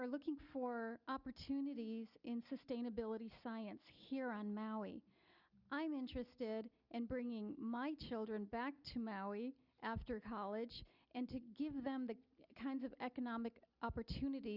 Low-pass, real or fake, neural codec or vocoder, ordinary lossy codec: 5.4 kHz; real; none; Opus, 64 kbps